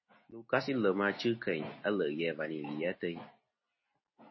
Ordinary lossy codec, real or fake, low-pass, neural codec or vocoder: MP3, 24 kbps; real; 7.2 kHz; none